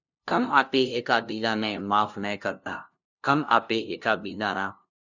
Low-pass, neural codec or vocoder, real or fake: 7.2 kHz; codec, 16 kHz, 0.5 kbps, FunCodec, trained on LibriTTS, 25 frames a second; fake